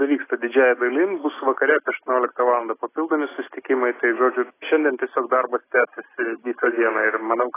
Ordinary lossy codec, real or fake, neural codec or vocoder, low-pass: AAC, 16 kbps; real; none; 3.6 kHz